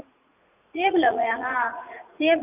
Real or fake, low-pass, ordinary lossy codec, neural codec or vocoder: fake; 3.6 kHz; none; vocoder, 44.1 kHz, 80 mel bands, Vocos